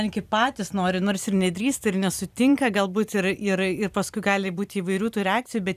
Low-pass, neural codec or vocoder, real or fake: 14.4 kHz; vocoder, 44.1 kHz, 128 mel bands every 256 samples, BigVGAN v2; fake